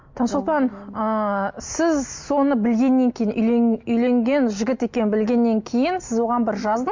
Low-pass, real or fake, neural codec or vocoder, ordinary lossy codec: 7.2 kHz; real; none; MP3, 48 kbps